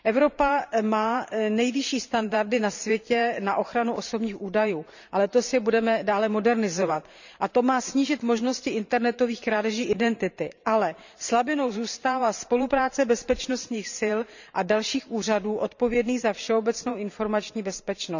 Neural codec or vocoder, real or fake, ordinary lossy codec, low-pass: vocoder, 44.1 kHz, 128 mel bands every 512 samples, BigVGAN v2; fake; none; 7.2 kHz